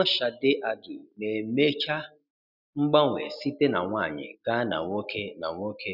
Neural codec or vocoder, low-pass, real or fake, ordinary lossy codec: none; 5.4 kHz; real; none